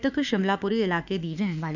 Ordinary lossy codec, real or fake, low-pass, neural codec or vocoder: none; fake; 7.2 kHz; autoencoder, 48 kHz, 32 numbers a frame, DAC-VAE, trained on Japanese speech